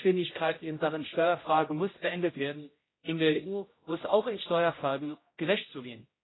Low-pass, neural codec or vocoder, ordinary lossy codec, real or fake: 7.2 kHz; codec, 16 kHz, 0.5 kbps, X-Codec, HuBERT features, trained on general audio; AAC, 16 kbps; fake